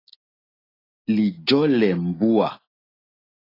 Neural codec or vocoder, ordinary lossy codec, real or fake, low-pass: none; AAC, 24 kbps; real; 5.4 kHz